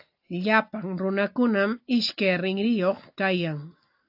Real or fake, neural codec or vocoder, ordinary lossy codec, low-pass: real; none; AAC, 48 kbps; 5.4 kHz